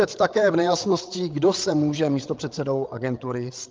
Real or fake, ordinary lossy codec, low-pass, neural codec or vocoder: fake; Opus, 32 kbps; 7.2 kHz; codec, 16 kHz, 8 kbps, FreqCodec, larger model